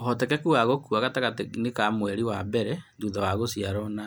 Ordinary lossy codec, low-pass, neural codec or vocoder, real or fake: none; none; none; real